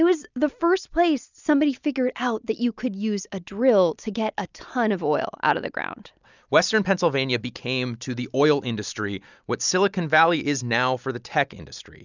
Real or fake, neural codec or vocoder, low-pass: real; none; 7.2 kHz